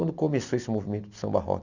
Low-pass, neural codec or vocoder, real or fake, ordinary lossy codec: 7.2 kHz; none; real; none